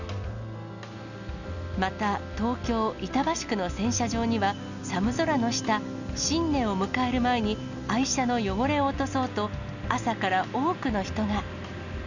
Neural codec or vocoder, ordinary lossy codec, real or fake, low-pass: none; none; real; 7.2 kHz